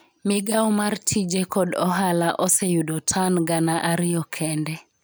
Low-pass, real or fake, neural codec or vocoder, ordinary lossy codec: none; real; none; none